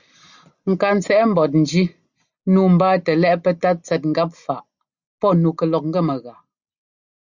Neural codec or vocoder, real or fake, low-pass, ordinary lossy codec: none; real; 7.2 kHz; Opus, 64 kbps